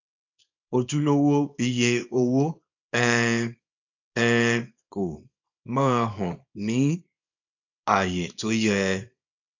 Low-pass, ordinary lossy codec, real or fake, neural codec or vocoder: 7.2 kHz; none; fake; codec, 24 kHz, 0.9 kbps, WavTokenizer, small release